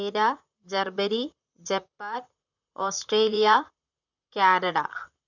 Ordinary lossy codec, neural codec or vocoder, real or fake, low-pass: none; vocoder, 44.1 kHz, 128 mel bands, Pupu-Vocoder; fake; 7.2 kHz